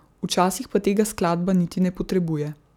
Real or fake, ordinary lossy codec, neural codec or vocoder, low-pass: real; none; none; 19.8 kHz